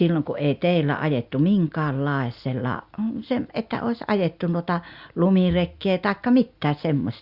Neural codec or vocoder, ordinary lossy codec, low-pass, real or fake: none; Opus, 64 kbps; 5.4 kHz; real